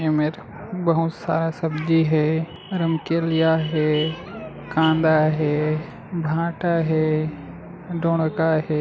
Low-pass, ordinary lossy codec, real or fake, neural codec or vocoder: none; none; real; none